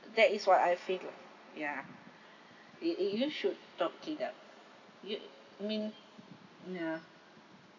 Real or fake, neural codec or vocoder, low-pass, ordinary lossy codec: real; none; 7.2 kHz; none